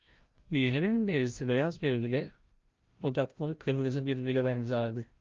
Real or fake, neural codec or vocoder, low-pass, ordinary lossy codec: fake; codec, 16 kHz, 0.5 kbps, FreqCodec, larger model; 7.2 kHz; Opus, 32 kbps